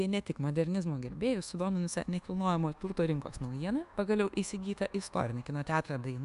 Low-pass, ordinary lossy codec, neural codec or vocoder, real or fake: 10.8 kHz; AAC, 96 kbps; codec, 24 kHz, 1.2 kbps, DualCodec; fake